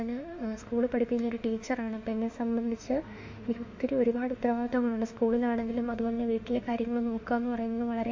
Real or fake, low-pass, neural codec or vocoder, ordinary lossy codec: fake; 7.2 kHz; autoencoder, 48 kHz, 32 numbers a frame, DAC-VAE, trained on Japanese speech; MP3, 48 kbps